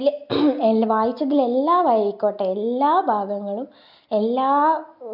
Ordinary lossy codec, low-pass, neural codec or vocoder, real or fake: MP3, 48 kbps; 5.4 kHz; none; real